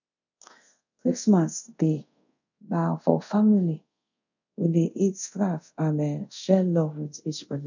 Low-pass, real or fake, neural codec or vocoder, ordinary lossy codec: 7.2 kHz; fake; codec, 24 kHz, 0.5 kbps, DualCodec; none